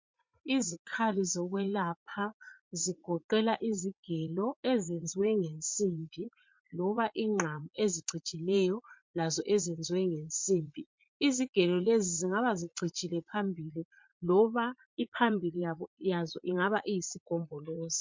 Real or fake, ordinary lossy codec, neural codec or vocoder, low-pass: fake; MP3, 48 kbps; vocoder, 44.1 kHz, 80 mel bands, Vocos; 7.2 kHz